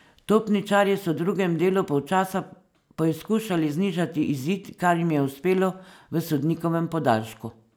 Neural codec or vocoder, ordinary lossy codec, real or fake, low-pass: none; none; real; none